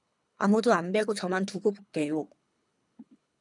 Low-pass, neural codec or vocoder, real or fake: 10.8 kHz; codec, 24 kHz, 3 kbps, HILCodec; fake